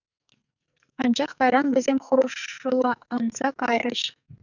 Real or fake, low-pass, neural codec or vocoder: fake; 7.2 kHz; codec, 44.1 kHz, 2.6 kbps, SNAC